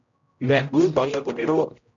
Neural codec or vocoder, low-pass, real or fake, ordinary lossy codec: codec, 16 kHz, 0.5 kbps, X-Codec, HuBERT features, trained on general audio; 7.2 kHz; fake; MP3, 64 kbps